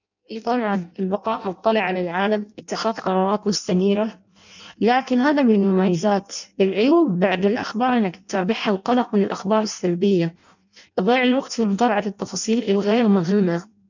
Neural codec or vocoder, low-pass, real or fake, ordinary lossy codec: codec, 16 kHz in and 24 kHz out, 0.6 kbps, FireRedTTS-2 codec; 7.2 kHz; fake; none